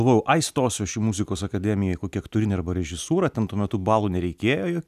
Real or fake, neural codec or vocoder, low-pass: real; none; 14.4 kHz